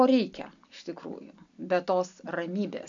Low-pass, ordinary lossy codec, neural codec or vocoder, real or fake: 7.2 kHz; AAC, 64 kbps; none; real